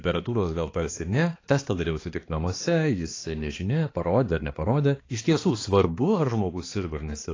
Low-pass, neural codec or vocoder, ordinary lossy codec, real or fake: 7.2 kHz; codec, 16 kHz, 2 kbps, X-Codec, HuBERT features, trained on balanced general audio; AAC, 32 kbps; fake